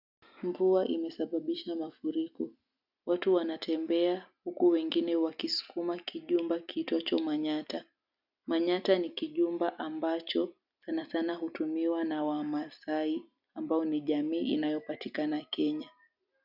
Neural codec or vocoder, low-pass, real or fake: none; 5.4 kHz; real